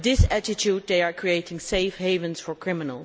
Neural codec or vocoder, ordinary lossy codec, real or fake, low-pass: none; none; real; none